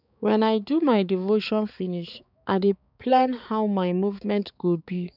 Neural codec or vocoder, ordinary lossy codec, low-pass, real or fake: codec, 16 kHz, 4 kbps, X-Codec, HuBERT features, trained on balanced general audio; none; 5.4 kHz; fake